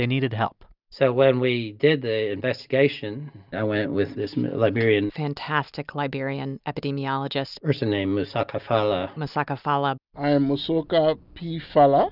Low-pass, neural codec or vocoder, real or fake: 5.4 kHz; none; real